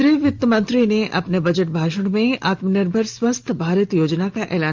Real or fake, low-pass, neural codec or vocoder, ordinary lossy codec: real; 7.2 kHz; none; Opus, 32 kbps